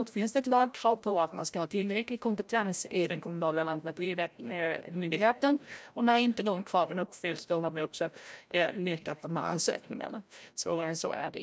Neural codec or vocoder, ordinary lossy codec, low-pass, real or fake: codec, 16 kHz, 0.5 kbps, FreqCodec, larger model; none; none; fake